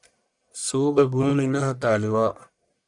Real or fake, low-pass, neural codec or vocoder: fake; 10.8 kHz; codec, 44.1 kHz, 1.7 kbps, Pupu-Codec